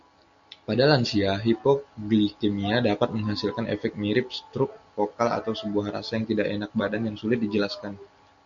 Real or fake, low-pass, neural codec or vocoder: real; 7.2 kHz; none